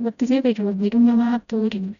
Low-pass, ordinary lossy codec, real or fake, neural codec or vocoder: 7.2 kHz; none; fake; codec, 16 kHz, 0.5 kbps, FreqCodec, smaller model